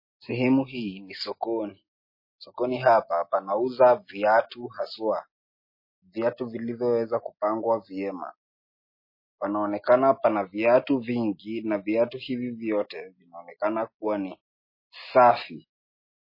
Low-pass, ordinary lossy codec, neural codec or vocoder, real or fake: 5.4 kHz; MP3, 24 kbps; none; real